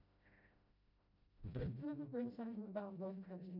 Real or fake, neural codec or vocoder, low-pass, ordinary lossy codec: fake; codec, 16 kHz, 0.5 kbps, FreqCodec, smaller model; 5.4 kHz; none